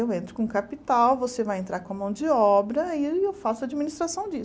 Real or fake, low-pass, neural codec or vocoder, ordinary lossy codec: real; none; none; none